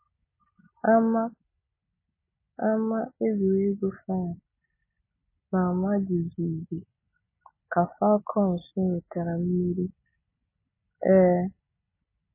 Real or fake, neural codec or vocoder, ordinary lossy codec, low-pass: real; none; MP3, 16 kbps; 3.6 kHz